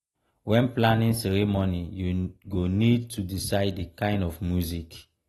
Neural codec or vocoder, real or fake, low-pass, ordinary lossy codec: none; real; 19.8 kHz; AAC, 32 kbps